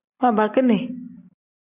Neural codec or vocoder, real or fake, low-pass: none; real; 3.6 kHz